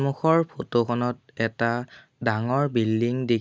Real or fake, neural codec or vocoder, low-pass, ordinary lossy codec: real; none; none; none